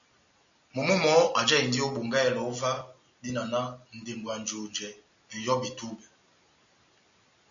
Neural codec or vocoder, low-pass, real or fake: none; 7.2 kHz; real